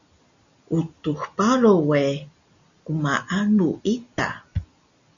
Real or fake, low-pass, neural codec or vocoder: real; 7.2 kHz; none